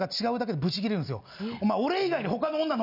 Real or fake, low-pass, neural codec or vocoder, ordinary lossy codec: real; 5.4 kHz; none; none